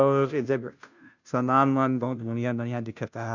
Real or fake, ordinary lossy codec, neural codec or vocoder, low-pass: fake; none; codec, 16 kHz, 0.5 kbps, FunCodec, trained on Chinese and English, 25 frames a second; 7.2 kHz